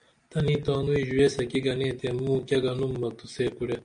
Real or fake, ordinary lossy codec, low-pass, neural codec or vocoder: real; MP3, 96 kbps; 9.9 kHz; none